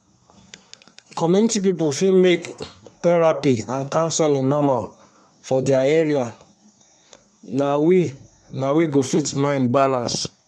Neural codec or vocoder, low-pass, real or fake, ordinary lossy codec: codec, 24 kHz, 1 kbps, SNAC; none; fake; none